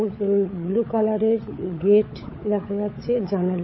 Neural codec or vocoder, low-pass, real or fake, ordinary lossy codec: codec, 16 kHz, 16 kbps, FunCodec, trained on LibriTTS, 50 frames a second; 7.2 kHz; fake; MP3, 24 kbps